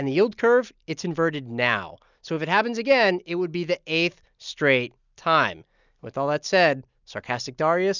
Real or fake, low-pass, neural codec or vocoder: real; 7.2 kHz; none